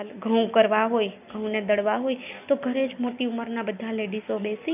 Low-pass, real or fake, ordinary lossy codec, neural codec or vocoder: 3.6 kHz; real; none; none